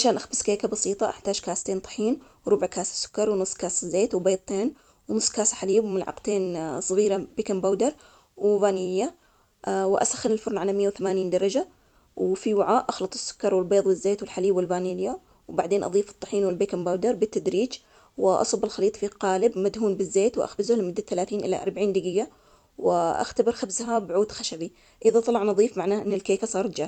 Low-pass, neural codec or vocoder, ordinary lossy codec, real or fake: 19.8 kHz; vocoder, 44.1 kHz, 128 mel bands every 256 samples, BigVGAN v2; none; fake